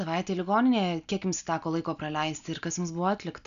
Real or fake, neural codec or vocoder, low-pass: real; none; 7.2 kHz